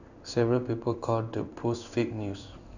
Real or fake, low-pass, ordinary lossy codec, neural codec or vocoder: fake; 7.2 kHz; none; codec, 16 kHz in and 24 kHz out, 1 kbps, XY-Tokenizer